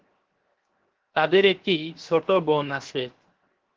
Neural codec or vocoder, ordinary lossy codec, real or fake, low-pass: codec, 16 kHz, 0.7 kbps, FocalCodec; Opus, 16 kbps; fake; 7.2 kHz